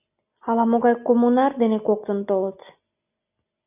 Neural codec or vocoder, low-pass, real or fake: none; 3.6 kHz; real